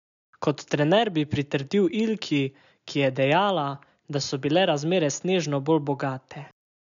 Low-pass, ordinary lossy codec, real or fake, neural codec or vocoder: 7.2 kHz; none; real; none